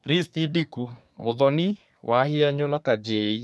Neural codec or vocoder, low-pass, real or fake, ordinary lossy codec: codec, 24 kHz, 1 kbps, SNAC; none; fake; none